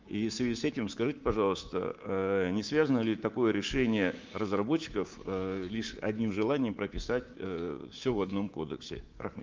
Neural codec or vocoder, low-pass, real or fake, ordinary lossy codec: codec, 24 kHz, 3.1 kbps, DualCodec; 7.2 kHz; fake; Opus, 32 kbps